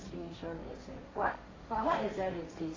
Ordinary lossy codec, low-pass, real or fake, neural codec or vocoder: AAC, 32 kbps; 7.2 kHz; fake; codec, 16 kHz, 1.1 kbps, Voila-Tokenizer